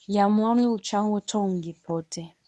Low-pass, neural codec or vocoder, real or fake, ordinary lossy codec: none; codec, 24 kHz, 0.9 kbps, WavTokenizer, medium speech release version 1; fake; none